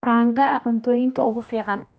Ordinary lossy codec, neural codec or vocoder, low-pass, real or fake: none; codec, 16 kHz, 1 kbps, X-Codec, HuBERT features, trained on general audio; none; fake